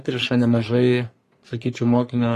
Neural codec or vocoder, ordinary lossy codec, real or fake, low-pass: codec, 44.1 kHz, 3.4 kbps, Pupu-Codec; AAC, 48 kbps; fake; 14.4 kHz